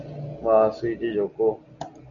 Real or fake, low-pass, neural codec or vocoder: real; 7.2 kHz; none